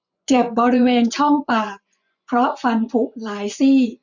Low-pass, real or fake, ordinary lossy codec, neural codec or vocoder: 7.2 kHz; fake; none; vocoder, 24 kHz, 100 mel bands, Vocos